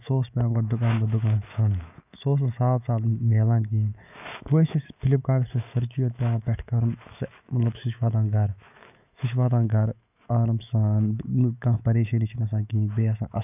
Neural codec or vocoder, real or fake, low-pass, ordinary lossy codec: autoencoder, 48 kHz, 128 numbers a frame, DAC-VAE, trained on Japanese speech; fake; 3.6 kHz; none